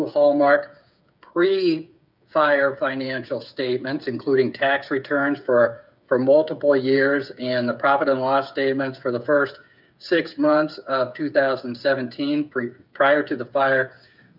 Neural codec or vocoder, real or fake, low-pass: codec, 16 kHz, 8 kbps, FreqCodec, smaller model; fake; 5.4 kHz